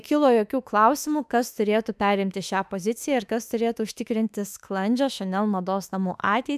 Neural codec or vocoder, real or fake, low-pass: autoencoder, 48 kHz, 32 numbers a frame, DAC-VAE, trained on Japanese speech; fake; 14.4 kHz